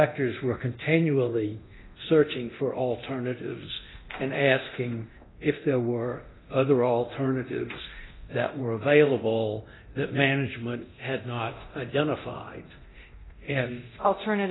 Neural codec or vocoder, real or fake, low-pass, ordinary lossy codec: codec, 24 kHz, 0.9 kbps, DualCodec; fake; 7.2 kHz; AAC, 16 kbps